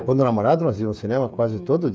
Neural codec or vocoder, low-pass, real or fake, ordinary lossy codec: codec, 16 kHz, 8 kbps, FreqCodec, smaller model; none; fake; none